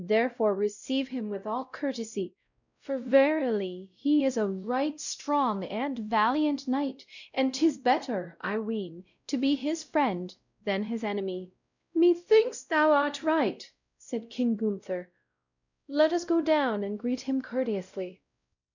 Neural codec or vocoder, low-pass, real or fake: codec, 16 kHz, 0.5 kbps, X-Codec, WavLM features, trained on Multilingual LibriSpeech; 7.2 kHz; fake